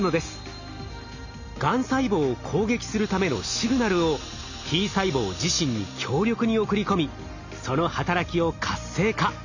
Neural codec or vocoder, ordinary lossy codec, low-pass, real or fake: none; none; 7.2 kHz; real